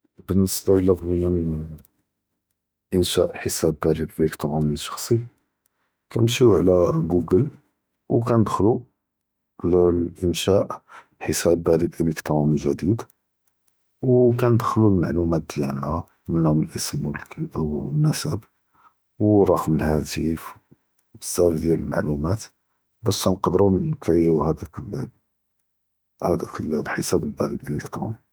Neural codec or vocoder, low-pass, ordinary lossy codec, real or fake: autoencoder, 48 kHz, 32 numbers a frame, DAC-VAE, trained on Japanese speech; none; none; fake